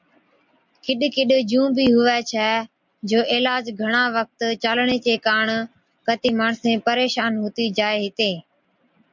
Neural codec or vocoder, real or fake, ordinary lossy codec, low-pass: none; real; MP3, 64 kbps; 7.2 kHz